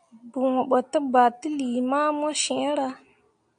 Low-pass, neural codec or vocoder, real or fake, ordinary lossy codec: 9.9 kHz; none; real; AAC, 64 kbps